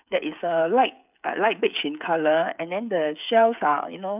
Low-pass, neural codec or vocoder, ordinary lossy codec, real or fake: 3.6 kHz; codec, 16 kHz, 8 kbps, FreqCodec, smaller model; none; fake